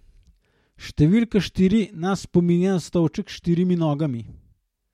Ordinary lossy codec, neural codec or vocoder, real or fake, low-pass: MP3, 64 kbps; none; real; 19.8 kHz